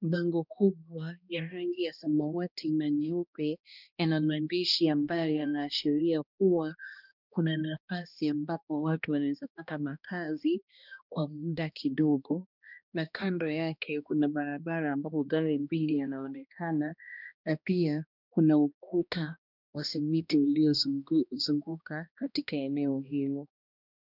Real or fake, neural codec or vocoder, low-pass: fake; codec, 16 kHz, 1 kbps, X-Codec, HuBERT features, trained on balanced general audio; 5.4 kHz